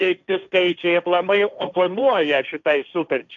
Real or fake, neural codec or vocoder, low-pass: fake; codec, 16 kHz, 1.1 kbps, Voila-Tokenizer; 7.2 kHz